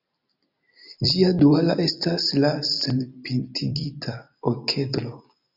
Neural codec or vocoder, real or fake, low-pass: vocoder, 44.1 kHz, 128 mel bands every 256 samples, BigVGAN v2; fake; 5.4 kHz